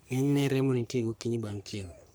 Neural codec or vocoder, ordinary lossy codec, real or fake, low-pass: codec, 44.1 kHz, 3.4 kbps, Pupu-Codec; none; fake; none